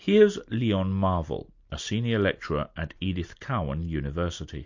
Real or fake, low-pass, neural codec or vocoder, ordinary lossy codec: real; 7.2 kHz; none; MP3, 48 kbps